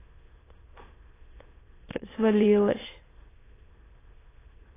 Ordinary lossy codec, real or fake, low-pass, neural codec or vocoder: AAC, 16 kbps; fake; 3.6 kHz; autoencoder, 22.05 kHz, a latent of 192 numbers a frame, VITS, trained on many speakers